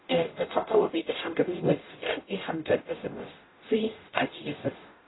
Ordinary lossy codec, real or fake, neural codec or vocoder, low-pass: AAC, 16 kbps; fake; codec, 44.1 kHz, 0.9 kbps, DAC; 7.2 kHz